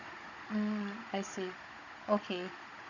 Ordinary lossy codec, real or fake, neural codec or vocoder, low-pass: none; fake; codec, 16 kHz, 16 kbps, FunCodec, trained on Chinese and English, 50 frames a second; 7.2 kHz